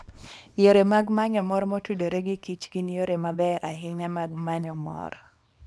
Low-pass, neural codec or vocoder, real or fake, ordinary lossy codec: none; codec, 24 kHz, 0.9 kbps, WavTokenizer, small release; fake; none